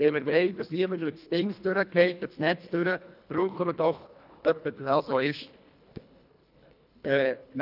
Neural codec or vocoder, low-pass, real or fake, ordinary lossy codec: codec, 24 kHz, 1.5 kbps, HILCodec; 5.4 kHz; fake; none